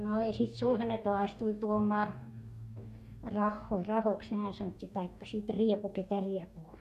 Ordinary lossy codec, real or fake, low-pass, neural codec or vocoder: none; fake; 14.4 kHz; codec, 44.1 kHz, 2.6 kbps, DAC